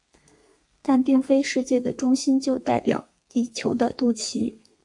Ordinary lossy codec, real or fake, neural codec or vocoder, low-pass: AAC, 64 kbps; fake; codec, 32 kHz, 1.9 kbps, SNAC; 10.8 kHz